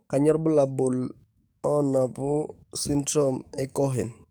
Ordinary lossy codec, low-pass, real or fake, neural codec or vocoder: none; none; fake; codec, 44.1 kHz, 7.8 kbps, DAC